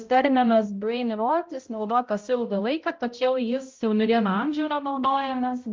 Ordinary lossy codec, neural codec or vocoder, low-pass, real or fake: Opus, 32 kbps; codec, 16 kHz, 0.5 kbps, X-Codec, HuBERT features, trained on balanced general audio; 7.2 kHz; fake